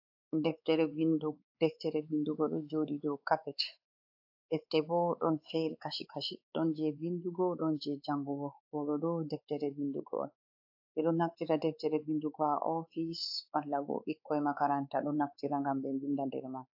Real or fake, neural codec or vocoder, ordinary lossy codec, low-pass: fake; codec, 16 kHz, 4 kbps, X-Codec, WavLM features, trained on Multilingual LibriSpeech; AAC, 48 kbps; 5.4 kHz